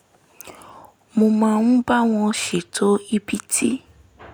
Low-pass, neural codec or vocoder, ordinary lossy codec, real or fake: none; none; none; real